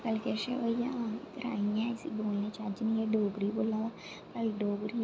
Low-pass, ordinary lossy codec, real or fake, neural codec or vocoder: none; none; real; none